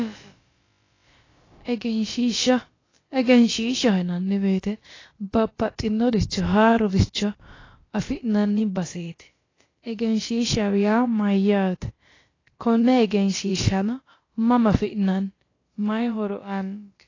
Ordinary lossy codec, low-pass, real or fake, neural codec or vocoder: AAC, 32 kbps; 7.2 kHz; fake; codec, 16 kHz, about 1 kbps, DyCAST, with the encoder's durations